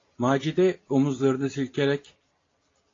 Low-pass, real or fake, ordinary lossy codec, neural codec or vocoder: 7.2 kHz; real; AAC, 32 kbps; none